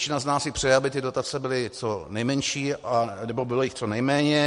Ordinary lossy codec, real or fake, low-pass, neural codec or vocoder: MP3, 48 kbps; real; 10.8 kHz; none